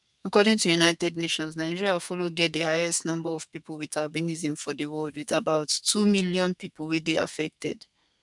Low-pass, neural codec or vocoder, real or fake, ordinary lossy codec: 10.8 kHz; codec, 32 kHz, 1.9 kbps, SNAC; fake; MP3, 96 kbps